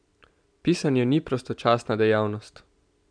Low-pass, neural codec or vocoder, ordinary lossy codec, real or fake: 9.9 kHz; none; none; real